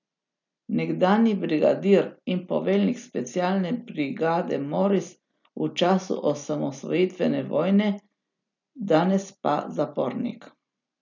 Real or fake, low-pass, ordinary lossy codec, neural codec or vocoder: real; 7.2 kHz; none; none